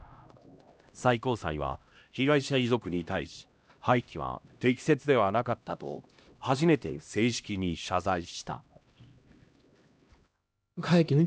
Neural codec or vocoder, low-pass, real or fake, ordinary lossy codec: codec, 16 kHz, 1 kbps, X-Codec, HuBERT features, trained on LibriSpeech; none; fake; none